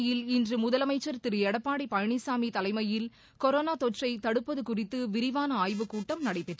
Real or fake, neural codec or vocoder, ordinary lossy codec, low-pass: real; none; none; none